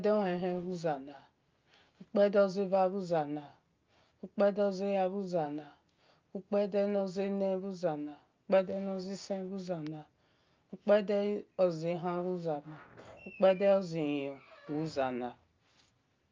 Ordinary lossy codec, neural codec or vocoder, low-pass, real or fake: Opus, 24 kbps; none; 7.2 kHz; real